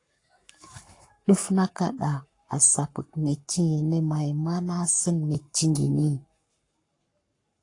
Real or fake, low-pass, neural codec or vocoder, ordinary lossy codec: fake; 10.8 kHz; codec, 44.1 kHz, 7.8 kbps, Pupu-Codec; AAC, 48 kbps